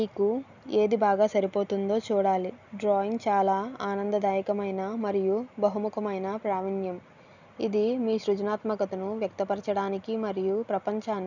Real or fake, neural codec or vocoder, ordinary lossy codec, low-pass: real; none; none; 7.2 kHz